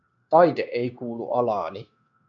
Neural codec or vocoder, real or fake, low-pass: codec, 16 kHz, 0.9 kbps, LongCat-Audio-Codec; fake; 7.2 kHz